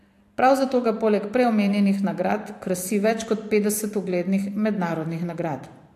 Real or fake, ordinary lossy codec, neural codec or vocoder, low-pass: fake; AAC, 64 kbps; vocoder, 44.1 kHz, 128 mel bands every 512 samples, BigVGAN v2; 14.4 kHz